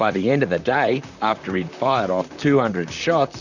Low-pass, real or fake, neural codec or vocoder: 7.2 kHz; fake; vocoder, 44.1 kHz, 128 mel bands, Pupu-Vocoder